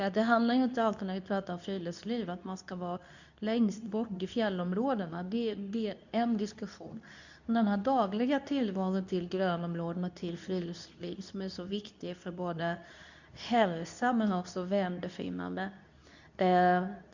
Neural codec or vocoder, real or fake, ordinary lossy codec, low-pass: codec, 24 kHz, 0.9 kbps, WavTokenizer, medium speech release version 2; fake; none; 7.2 kHz